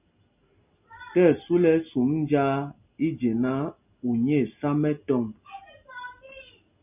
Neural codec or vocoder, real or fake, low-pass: none; real; 3.6 kHz